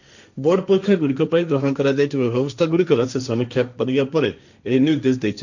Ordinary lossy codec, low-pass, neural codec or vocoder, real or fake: none; 7.2 kHz; codec, 16 kHz, 1.1 kbps, Voila-Tokenizer; fake